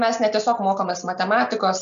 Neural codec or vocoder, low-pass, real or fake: none; 7.2 kHz; real